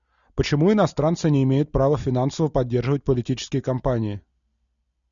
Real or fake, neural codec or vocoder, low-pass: real; none; 7.2 kHz